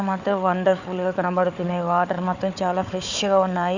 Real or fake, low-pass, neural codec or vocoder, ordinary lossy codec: fake; 7.2 kHz; codec, 16 kHz, 4 kbps, FunCodec, trained on Chinese and English, 50 frames a second; none